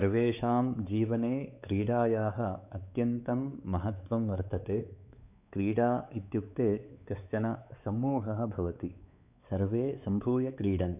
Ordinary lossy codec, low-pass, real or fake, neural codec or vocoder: none; 3.6 kHz; fake; codec, 16 kHz, 4 kbps, X-Codec, WavLM features, trained on Multilingual LibriSpeech